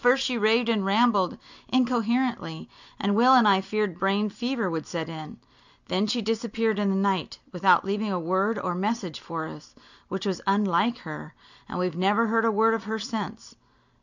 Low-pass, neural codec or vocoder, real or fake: 7.2 kHz; none; real